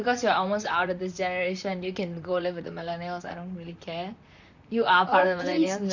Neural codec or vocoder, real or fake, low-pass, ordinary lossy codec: vocoder, 44.1 kHz, 128 mel bands, Pupu-Vocoder; fake; 7.2 kHz; none